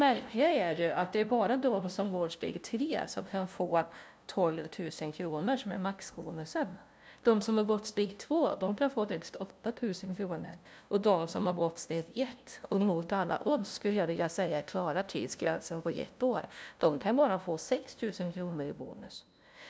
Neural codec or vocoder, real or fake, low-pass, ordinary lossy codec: codec, 16 kHz, 0.5 kbps, FunCodec, trained on LibriTTS, 25 frames a second; fake; none; none